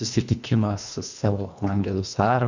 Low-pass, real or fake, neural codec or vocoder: 7.2 kHz; fake; codec, 24 kHz, 1.5 kbps, HILCodec